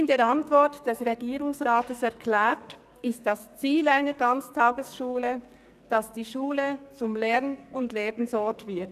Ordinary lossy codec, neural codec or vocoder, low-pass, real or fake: none; codec, 44.1 kHz, 2.6 kbps, SNAC; 14.4 kHz; fake